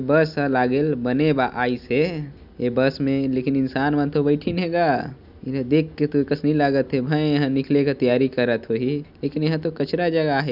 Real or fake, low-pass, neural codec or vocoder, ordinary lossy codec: real; 5.4 kHz; none; none